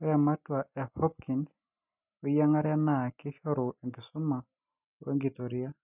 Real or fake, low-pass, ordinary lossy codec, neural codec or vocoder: real; 3.6 kHz; none; none